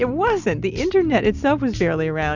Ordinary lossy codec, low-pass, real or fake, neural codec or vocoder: Opus, 64 kbps; 7.2 kHz; real; none